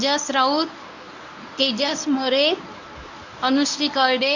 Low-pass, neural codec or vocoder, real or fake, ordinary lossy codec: 7.2 kHz; codec, 24 kHz, 0.9 kbps, WavTokenizer, medium speech release version 1; fake; none